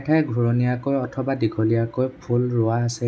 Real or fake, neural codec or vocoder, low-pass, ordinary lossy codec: real; none; none; none